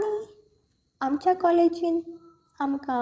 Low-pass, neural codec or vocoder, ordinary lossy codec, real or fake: none; codec, 16 kHz, 16 kbps, FreqCodec, larger model; none; fake